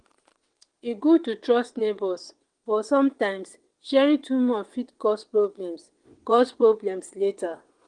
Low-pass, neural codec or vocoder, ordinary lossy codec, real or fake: 9.9 kHz; vocoder, 22.05 kHz, 80 mel bands, WaveNeXt; Opus, 24 kbps; fake